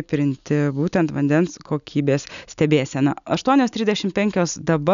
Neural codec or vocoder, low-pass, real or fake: none; 7.2 kHz; real